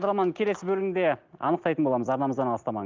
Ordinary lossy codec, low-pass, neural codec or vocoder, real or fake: Opus, 24 kbps; 7.2 kHz; none; real